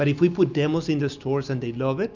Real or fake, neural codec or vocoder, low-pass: real; none; 7.2 kHz